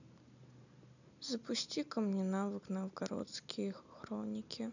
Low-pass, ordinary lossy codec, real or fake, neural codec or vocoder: 7.2 kHz; none; real; none